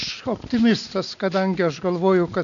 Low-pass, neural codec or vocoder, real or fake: 7.2 kHz; none; real